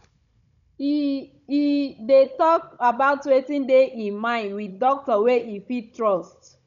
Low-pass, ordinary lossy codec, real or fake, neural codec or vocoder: 7.2 kHz; none; fake; codec, 16 kHz, 16 kbps, FunCodec, trained on Chinese and English, 50 frames a second